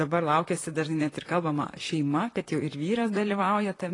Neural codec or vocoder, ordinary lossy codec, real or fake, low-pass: vocoder, 44.1 kHz, 128 mel bands, Pupu-Vocoder; AAC, 32 kbps; fake; 10.8 kHz